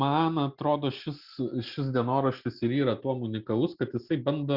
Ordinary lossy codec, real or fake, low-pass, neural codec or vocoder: Opus, 64 kbps; real; 5.4 kHz; none